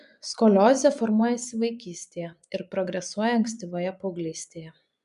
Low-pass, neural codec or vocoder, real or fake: 10.8 kHz; vocoder, 24 kHz, 100 mel bands, Vocos; fake